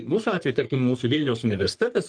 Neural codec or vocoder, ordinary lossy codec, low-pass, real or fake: codec, 44.1 kHz, 3.4 kbps, Pupu-Codec; Opus, 24 kbps; 9.9 kHz; fake